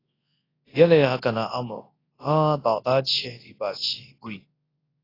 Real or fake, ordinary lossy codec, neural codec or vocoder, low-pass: fake; AAC, 24 kbps; codec, 24 kHz, 0.9 kbps, WavTokenizer, large speech release; 5.4 kHz